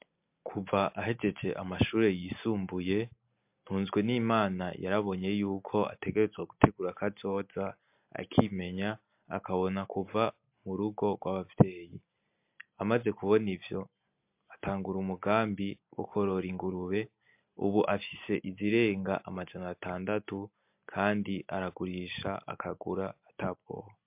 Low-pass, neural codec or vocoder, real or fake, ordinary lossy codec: 3.6 kHz; none; real; MP3, 32 kbps